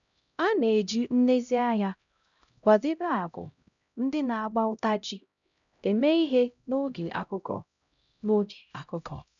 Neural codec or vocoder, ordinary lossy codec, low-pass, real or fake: codec, 16 kHz, 0.5 kbps, X-Codec, HuBERT features, trained on LibriSpeech; none; 7.2 kHz; fake